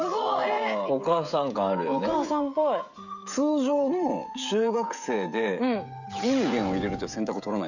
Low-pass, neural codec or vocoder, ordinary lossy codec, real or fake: 7.2 kHz; codec, 16 kHz, 16 kbps, FreqCodec, smaller model; none; fake